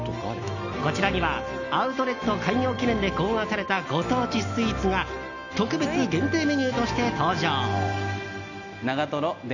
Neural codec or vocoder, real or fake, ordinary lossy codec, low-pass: none; real; none; 7.2 kHz